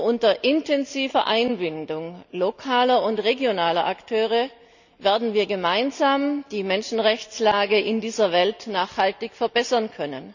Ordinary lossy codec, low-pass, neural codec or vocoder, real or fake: none; 7.2 kHz; none; real